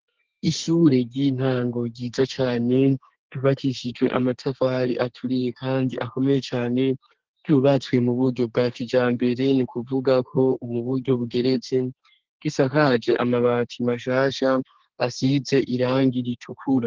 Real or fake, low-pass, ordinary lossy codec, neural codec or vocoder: fake; 7.2 kHz; Opus, 16 kbps; codec, 32 kHz, 1.9 kbps, SNAC